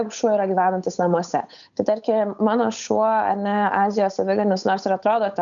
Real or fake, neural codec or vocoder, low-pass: fake; codec, 16 kHz, 8 kbps, FunCodec, trained on Chinese and English, 25 frames a second; 7.2 kHz